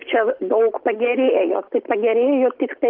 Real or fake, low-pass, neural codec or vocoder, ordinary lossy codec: real; 5.4 kHz; none; Opus, 32 kbps